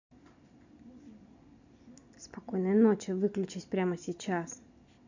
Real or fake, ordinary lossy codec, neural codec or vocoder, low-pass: real; none; none; 7.2 kHz